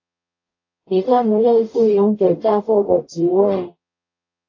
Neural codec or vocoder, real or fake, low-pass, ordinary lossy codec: codec, 44.1 kHz, 0.9 kbps, DAC; fake; 7.2 kHz; AAC, 48 kbps